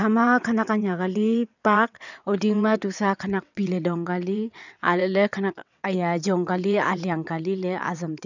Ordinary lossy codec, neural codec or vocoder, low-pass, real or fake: none; vocoder, 22.05 kHz, 80 mel bands, WaveNeXt; 7.2 kHz; fake